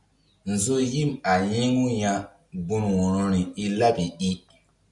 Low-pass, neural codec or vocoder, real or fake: 10.8 kHz; none; real